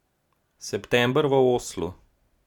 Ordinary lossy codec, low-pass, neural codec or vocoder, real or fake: none; 19.8 kHz; none; real